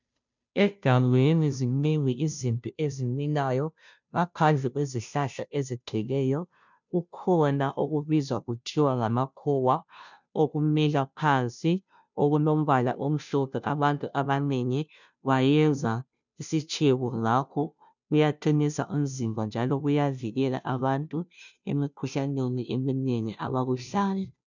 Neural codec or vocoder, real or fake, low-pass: codec, 16 kHz, 0.5 kbps, FunCodec, trained on Chinese and English, 25 frames a second; fake; 7.2 kHz